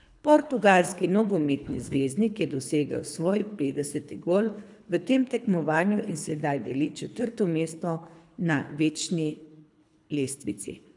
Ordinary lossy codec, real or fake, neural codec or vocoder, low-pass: none; fake; codec, 24 kHz, 3 kbps, HILCodec; 10.8 kHz